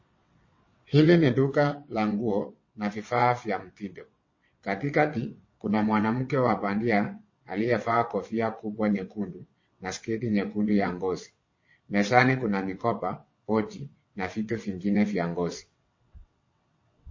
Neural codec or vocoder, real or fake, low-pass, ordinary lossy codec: vocoder, 22.05 kHz, 80 mel bands, WaveNeXt; fake; 7.2 kHz; MP3, 32 kbps